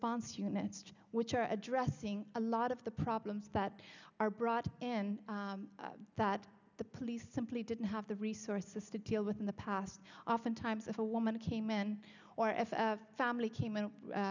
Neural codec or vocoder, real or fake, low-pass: none; real; 7.2 kHz